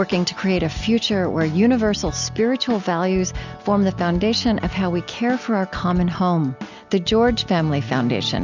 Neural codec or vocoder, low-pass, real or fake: none; 7.2 kHz; real